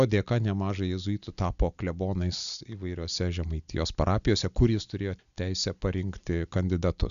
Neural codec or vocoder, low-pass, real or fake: none; 7.2 kHz; real